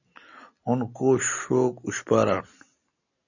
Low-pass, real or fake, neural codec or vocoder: 7.2 kHz; real; none